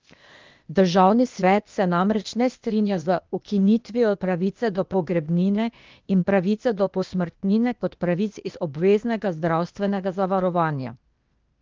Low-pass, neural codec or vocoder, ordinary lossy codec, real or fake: 7.2 kHz; codec, 16 kHz, 0.8 kbps, ZipCodec; Opus, 32 kbps; fake